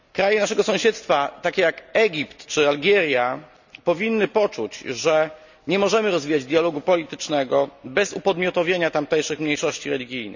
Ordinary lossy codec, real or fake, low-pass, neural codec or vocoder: none; real; 7.2 kHz; none